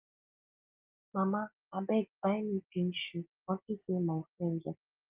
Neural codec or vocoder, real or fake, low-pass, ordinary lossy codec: none; real; 3.6 kHz; Opus, 32 kbps